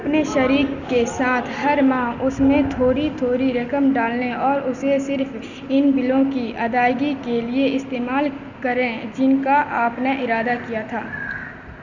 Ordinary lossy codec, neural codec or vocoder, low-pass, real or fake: none; none; 7.2 kHz; real